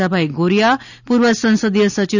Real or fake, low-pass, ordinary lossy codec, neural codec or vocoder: real; 7.2 kHz; none; none